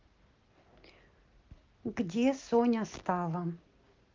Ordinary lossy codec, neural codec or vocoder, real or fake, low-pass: Opus, 32 kbps; none; real; 7.2 kHz